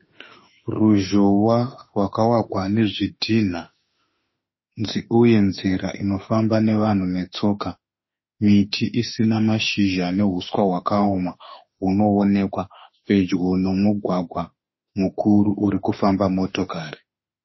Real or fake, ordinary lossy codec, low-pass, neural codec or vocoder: fake; MP3, 24 kbps; 7.2 kHz; autoencoder, 48 kHz, 32 numbers a frame, DAC-VAE, trained on Japanese speech